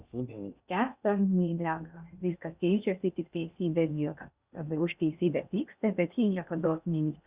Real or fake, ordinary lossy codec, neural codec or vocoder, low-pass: fake; Opus, 64 kbps; codec, 16 kHz in and 24 kHz out, 0.6 kbps, FocalCodec, streaming, 2048 codes; 3.6 kHz